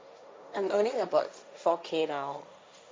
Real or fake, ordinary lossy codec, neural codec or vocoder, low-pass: fake; none; codec, 16 kHz, 1.1 kbps, Voila-Tokenizer; none